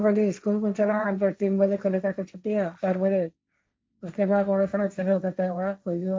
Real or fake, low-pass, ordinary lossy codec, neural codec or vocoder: fake; none; none; codec, 16 kHz, 1.1 kbps, Voila-Tokenizer